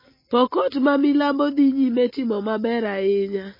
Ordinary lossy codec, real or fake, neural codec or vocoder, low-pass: MP3, 24 kbps; real; none; 5.4 kHz